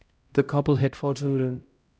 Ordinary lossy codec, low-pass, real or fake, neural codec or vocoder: none; none; fake; codec, 16 kHz, 0.5 kbps, X-Codec, HuBERT features, trained on LibriSpeech